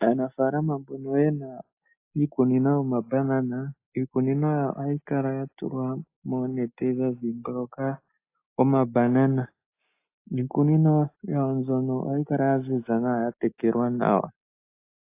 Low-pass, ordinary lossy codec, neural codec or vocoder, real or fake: 3.6 kHz; AAC, 24 kbps; none; real